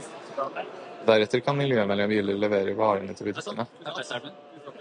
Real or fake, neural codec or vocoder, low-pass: real; none; 9.9 kHz